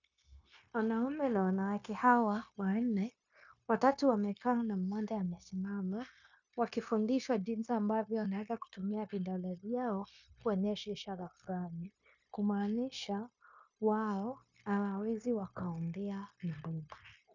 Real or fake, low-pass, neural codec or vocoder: fake; 7.2 kHz; codec, 16 kHz, 0.9 kbps, LongCat-Audio-Codec